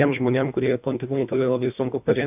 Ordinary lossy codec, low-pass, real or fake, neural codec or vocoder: AAC, 32 kbps; 3.6 kHz; fake; codec, 24 kHz, 1.5 kbps, HILCodec